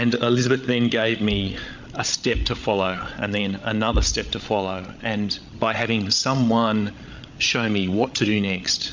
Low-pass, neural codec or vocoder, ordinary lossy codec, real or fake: 7.2 kHz; codec, 16 kHz, 16 kbps, FreqCodec, larger model; MP3, 64 kbps; fake